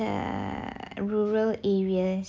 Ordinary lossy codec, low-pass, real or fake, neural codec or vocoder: none; none; real; none